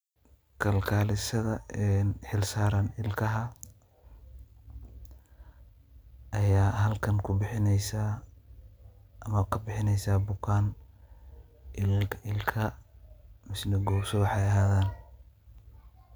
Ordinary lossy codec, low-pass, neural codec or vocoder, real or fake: none; none; none; real